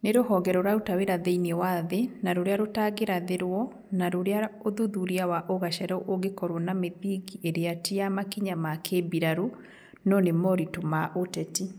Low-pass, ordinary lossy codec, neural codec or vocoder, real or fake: none; none; none; real